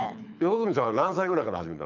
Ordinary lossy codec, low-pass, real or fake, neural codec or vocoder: none; 7.2 kHz; fake; codec, 24 kHz, 6 kbps, HILCodec